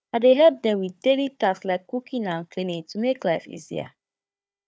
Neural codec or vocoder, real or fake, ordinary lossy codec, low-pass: codec, 16 kHz, 4 kbps, FunCodec, trained on Chinese and English, 50 frames a second; fake; none; none